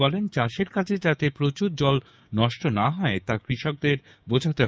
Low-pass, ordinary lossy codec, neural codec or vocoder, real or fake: none; none; codec, 16 kHz, 6 kbps, DAC; fake